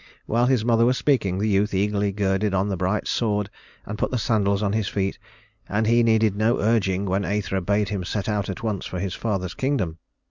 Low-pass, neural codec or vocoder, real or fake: 7.2 kHz; none; real